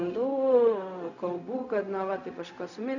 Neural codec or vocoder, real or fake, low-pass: codec, 16 kHz, 0.4 kbps, LongCat-Audio-Codec; fake; 7.2 kHz